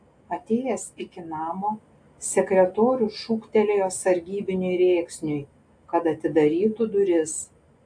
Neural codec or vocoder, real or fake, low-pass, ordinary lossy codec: none; real; 9.9 kHz; AAC, 64 kbps